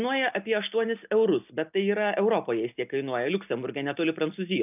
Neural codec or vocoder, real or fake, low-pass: none; real; 3.6 kHz